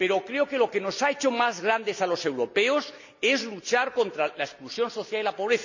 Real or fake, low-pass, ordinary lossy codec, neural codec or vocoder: real; 7.2 kHz; none; none